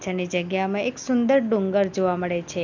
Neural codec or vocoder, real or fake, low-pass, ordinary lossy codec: none; real; 7.2 kHz; none